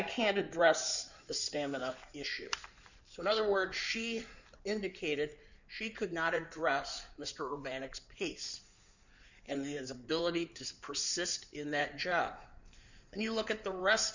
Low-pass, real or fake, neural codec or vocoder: 7.2 kHz; fake; codec, 16 kHz in and 24 kHz out, 2.2 kbps, FireRedTTS-2 codec